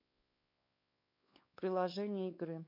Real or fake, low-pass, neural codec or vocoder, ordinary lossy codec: fake; 5.4 kHz; codec, 16 kHz, 4 kbps, X-Codec, WavLM features, trained on Multilingual LibriSpeech; MP3, 32 kbps